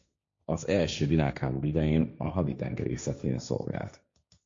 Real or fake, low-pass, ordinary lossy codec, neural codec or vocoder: fake; 7.2 kHz; MP3, 48 kbps; codec, 16 kHz, 1.1 kbps, Voila-Tokenizer